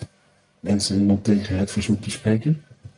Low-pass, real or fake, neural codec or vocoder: 10.8 kHz; fake; codec, 44.1 kHz, 1.7 kbps, Pupu-Codec